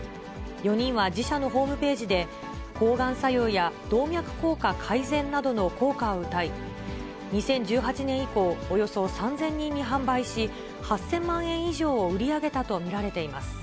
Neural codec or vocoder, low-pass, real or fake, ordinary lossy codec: none; none; real; none